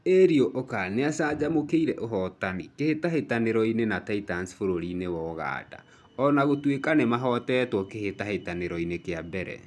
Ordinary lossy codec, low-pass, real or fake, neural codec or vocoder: none; none; real; none